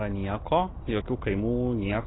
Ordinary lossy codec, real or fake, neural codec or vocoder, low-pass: AAC, 16 kbps; real; none; 7.2 kHz